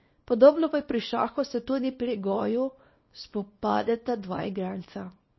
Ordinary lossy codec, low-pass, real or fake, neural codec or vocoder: MP3, 24 kbps; 7.2 kHz; fake; codec, 24 kHz, 0.9 kbps, WavTokenizer, small release